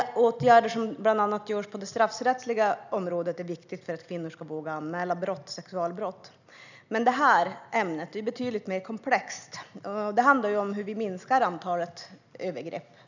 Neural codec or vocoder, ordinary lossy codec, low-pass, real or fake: none; none; 7.2 kHz; real